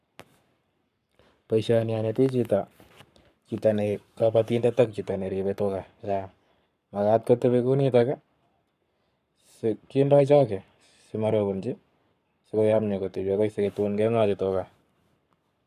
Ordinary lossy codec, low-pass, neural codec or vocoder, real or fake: none; 14.4 kHz; codec, 44.1 kHz, 7.8 kbps, Pupu-Codec; fake